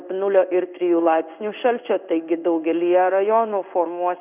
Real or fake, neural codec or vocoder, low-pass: fake; codec, 16 kHz in and 24 kHz out, 1 kbps, XY-Tokenizer; 3.6 kHz